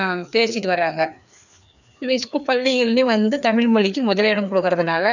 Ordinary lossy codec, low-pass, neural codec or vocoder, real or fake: none; 7.2 kHz; codec, 16 kHz, 2 kbps, FreqCodec, larger model; fake